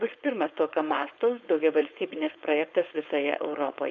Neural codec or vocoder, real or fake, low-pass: codec, 16 kHz, 4.8 kbps, FACodec; fake; 7.2 kHz